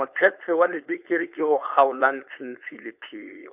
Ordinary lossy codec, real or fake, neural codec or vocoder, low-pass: none; fake; codec, 16 kHz, 4.8 kbps, FACodec; 3.6 kHz